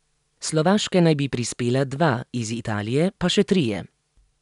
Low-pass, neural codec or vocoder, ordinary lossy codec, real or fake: 10.8 kHz; none; none; real